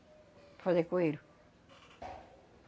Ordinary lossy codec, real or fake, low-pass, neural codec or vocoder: none; real; none; none